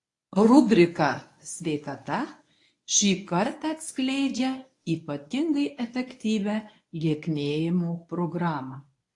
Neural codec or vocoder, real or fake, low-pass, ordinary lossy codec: codec, 24 kHz, 0.9 kbps, WavTokenizer, medium speech release version 1; fake; 10.8 kHz; AAC, 48 kbps